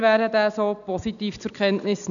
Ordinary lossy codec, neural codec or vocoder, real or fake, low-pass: none; none; real; 7.2 kHz